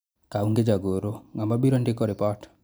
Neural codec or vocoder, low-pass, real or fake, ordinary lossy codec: none; none; real; none